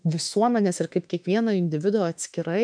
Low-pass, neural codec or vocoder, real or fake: 9.9 kHz; autoencoder, 48 kHz, 32 numbers a frame, DAC-VAE, trained on Japanese speech; fake